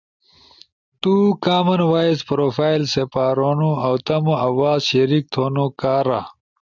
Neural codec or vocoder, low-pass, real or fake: none; 7.2 kHz; real